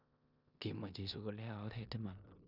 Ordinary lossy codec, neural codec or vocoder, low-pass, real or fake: none; codec, 16 kHz in and 24 kHz out, 0.9 kbps, LongCat-Audio-Codec, four codebook decoder; 5.4 kHz; fake